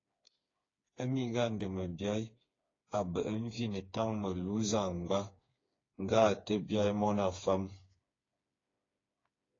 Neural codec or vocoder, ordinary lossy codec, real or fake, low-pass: codec, 16 kHz, 4 kbps, FreqCodec, smaller model; AAC, 32 kbps; fake; 7.2 kHz